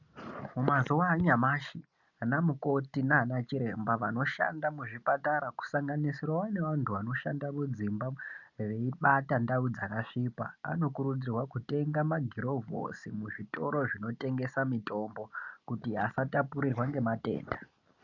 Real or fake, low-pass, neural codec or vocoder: real; 7.2 kHz; none